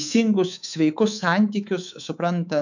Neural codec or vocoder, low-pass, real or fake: codec, 24 kHz, 3.1 kbps, DualCodec; 7.2 kHz; fake